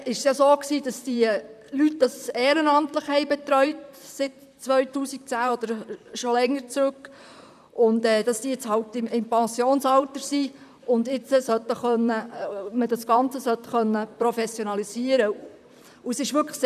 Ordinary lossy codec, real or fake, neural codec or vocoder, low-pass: none; fake; vocoder, 44.1 kHz, 128 mel bands, Pupu-Vocoder; 14.4 kHz